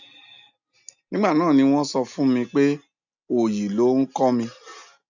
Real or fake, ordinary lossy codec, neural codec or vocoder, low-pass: real; none; none; 7.2 kHz